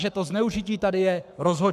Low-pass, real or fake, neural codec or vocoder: 14.4 kHz; fake; codec, 44.1 kHz, 7.8 kbps, Pupu-Codec